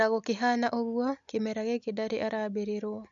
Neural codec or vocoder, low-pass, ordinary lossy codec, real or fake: none; 7.2 kHz; none; real